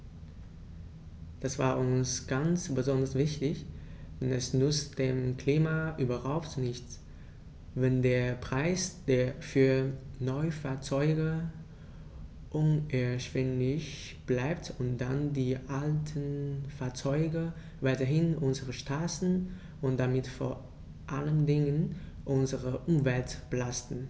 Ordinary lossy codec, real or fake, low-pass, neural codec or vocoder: none; real; none; none